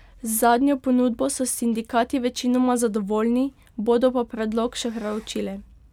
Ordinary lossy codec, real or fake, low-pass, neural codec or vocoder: none; real; 19.8 kHz; none